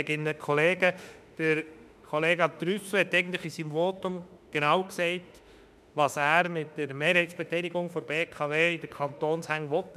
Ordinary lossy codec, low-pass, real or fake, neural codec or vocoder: none; 14.4 kHz; fake; autoencoder, 48 kHz, 32 numbers a frame, DAC-VAE, trained on Japanese speech